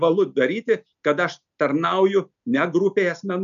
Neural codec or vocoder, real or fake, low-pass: none; real; 7.2 kHz